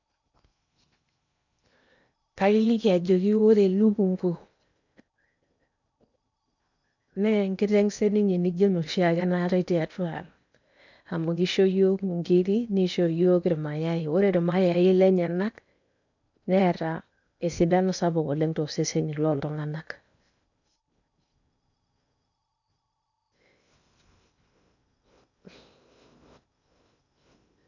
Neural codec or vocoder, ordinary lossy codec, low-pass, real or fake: codec, 16 kHz in and 24 kHz out, 0.8 kbps, FocalCodec, streaming, 65536 codes; none; 7.2 kHz; fake